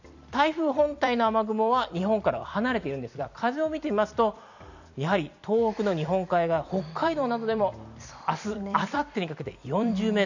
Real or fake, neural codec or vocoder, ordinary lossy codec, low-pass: fake; vocoder, 44.1 kHz, 128 mel bands every 256 samples, BigVGAN v2; none; 7.2 kHz